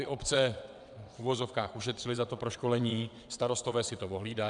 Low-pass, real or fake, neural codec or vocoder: 9.9 kHz; fake; vocoder, 22.05 kHz, 80 mel bands, WaveNeXt